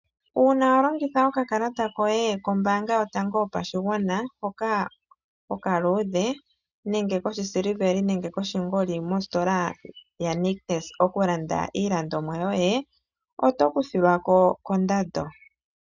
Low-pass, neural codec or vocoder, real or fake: 7.2 kHz; none; real